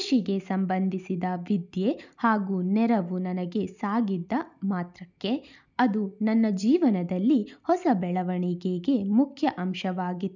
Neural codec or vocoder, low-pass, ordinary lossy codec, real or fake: none; 7.2 kHz; none; real